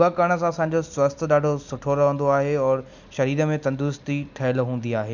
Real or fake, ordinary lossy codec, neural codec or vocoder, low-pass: real; none; none; 7.2 kHz